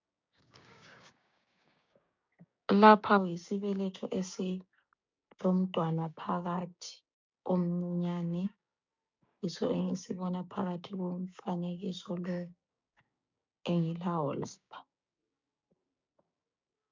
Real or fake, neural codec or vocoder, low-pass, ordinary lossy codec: fake; codec, 16 kHz, 6 kbps, DAC; 7.2 kHz; MP3, 64 kbps